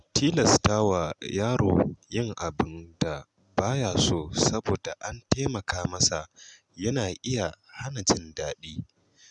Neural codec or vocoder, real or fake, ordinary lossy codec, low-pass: none; real; none; 10.8 kHz